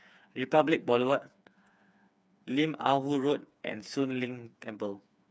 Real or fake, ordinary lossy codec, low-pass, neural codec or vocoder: fake; none; none; codec, 16 kHz, 4 kbps, FreqCodec, smaller model